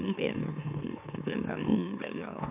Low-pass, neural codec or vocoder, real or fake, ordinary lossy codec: 3.6 kHz; autoencoder, 44.1 kHz, a latent of 192 numbers a frame, MeloTTS; fake; AAC, 32 kbps